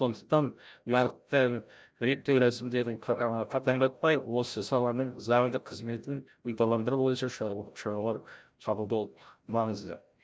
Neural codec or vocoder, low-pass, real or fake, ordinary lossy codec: codec, 16 kHz, 0.5 kbps, FreqCodec, larger model; none; fake; none